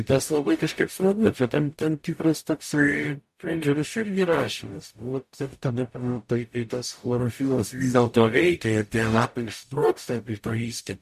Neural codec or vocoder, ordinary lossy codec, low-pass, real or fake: codec, 44.1 kHz, 0.9 kbps, DAC; MP3, 64 kbps; 14.4 kHz; fake